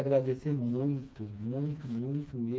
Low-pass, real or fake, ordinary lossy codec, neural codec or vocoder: none; fake; none; codec, 16 kHz, 2 kbps, FreqCodec, smaller model